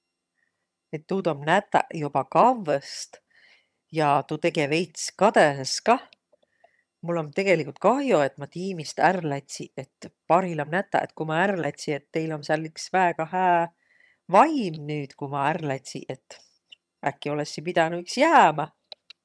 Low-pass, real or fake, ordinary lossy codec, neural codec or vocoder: none; fake; none; vocoder, 22.05 kHz, 80 mel bands, HiFi-GAN